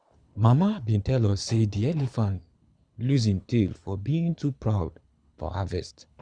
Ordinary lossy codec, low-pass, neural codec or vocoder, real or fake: Opus, 64 kbps; 9.9 kHz; codec, 24 kHz, 6 kbps, HILCodec; fake